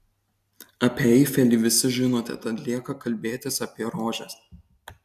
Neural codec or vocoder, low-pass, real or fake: none; 14.4 kHz; real